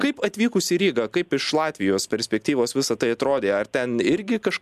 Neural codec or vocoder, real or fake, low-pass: none; real; 14.4 kHz